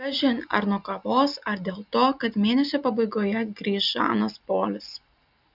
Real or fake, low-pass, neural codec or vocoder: real; 5.4 kHz; none